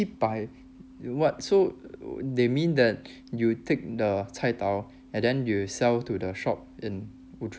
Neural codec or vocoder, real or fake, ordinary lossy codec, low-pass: none; real; none; none